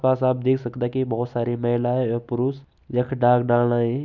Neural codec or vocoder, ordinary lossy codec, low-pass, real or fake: none; none; 7.2 kHz; real